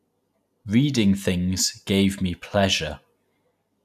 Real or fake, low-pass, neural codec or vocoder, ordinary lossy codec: real; 14.4 kHz; none; none